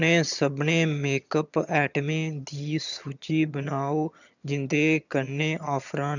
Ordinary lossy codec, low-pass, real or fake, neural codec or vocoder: none; 7.2 kHz; fake; vocoder, 22.05 kHz, 80 mel bands, HiFi-GAN